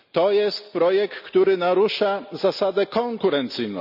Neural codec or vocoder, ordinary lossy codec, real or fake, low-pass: none; none; real; 5.4 kHz